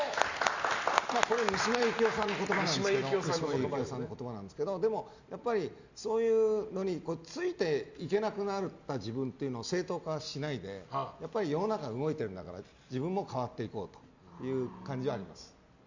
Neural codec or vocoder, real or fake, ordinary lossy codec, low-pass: none; real; Opus, 64 kbps; 7.2 kHz